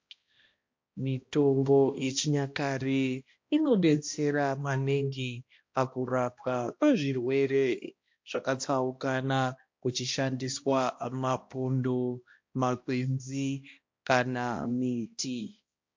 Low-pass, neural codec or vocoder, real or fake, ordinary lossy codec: 7.2 kHz; codec, 16 kHz, 1 kbps, X-Codec, HuBERT features, trained on balanced general audio; fake; MP3, 48 kbps